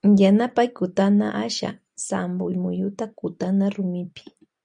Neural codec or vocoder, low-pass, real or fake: none; 9.9 kHz; real